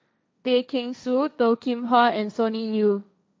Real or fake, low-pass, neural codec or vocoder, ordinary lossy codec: fake; none; codec, 16 kHz, 1.1 kbps, Voila-Tokenizer; none